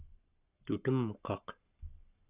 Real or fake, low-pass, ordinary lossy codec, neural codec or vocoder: fake; 3.6 kHz; Opus, 64 kbps; codec, 44.1 kHz, 7.8 kbps, Pupu-Codec